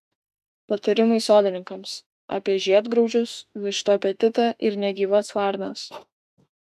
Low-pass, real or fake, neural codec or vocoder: 14.4 kHz; fake; autoencoder, 48 kHz, 32 numbers a frame, DAC-VAE, trained on Japanese speech